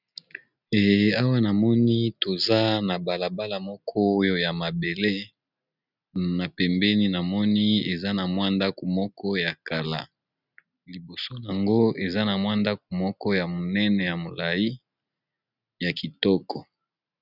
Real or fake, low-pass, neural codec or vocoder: real; 5.4 kHz; none